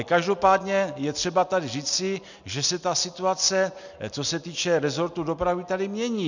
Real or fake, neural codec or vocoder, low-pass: real; none; 7.2 kHz